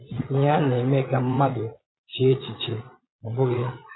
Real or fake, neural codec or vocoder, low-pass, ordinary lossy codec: fake; vocoder, 22.05 kHz, 80 mel bands, Vocos; 7.2 kHz; AAC, 16 kbps